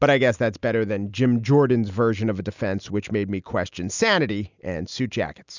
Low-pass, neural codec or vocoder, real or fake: 7.2 kHz; none; real